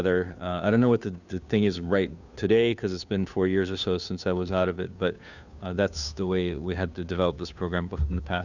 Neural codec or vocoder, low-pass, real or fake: codec, 16 kHz, 2 kbps, FunCodec, trained on Chinese and English, 25 frames a second; 7.2 kHz; fake